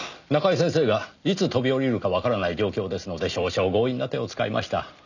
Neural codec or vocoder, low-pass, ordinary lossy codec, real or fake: none; 7.2 kHz; none; real